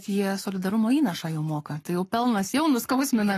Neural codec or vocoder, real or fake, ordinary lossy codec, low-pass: codec, 44.1 kHz, 7.8 kbps, Pupu-Codec; fake; AAC, 48 kbps; 14.4 kHz